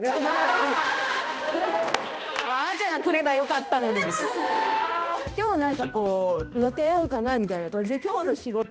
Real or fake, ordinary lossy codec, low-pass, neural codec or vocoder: fake; none; none; codec, 16 kHz, 1 kbps, X-Codec, HuBERT features, trained on balanced general audio